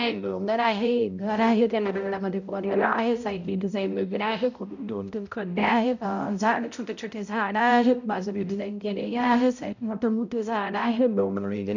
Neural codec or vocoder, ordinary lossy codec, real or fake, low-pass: codec, 16 kHz, 0.5 kbps, X-Codec, HuBERT features, trained on balanced general audio; none; fake; 7.2 kHz